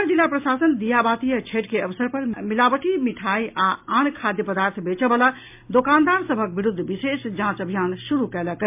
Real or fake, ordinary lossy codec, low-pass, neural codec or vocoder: real; MP3, 32 kbps; 3.6 kHz; none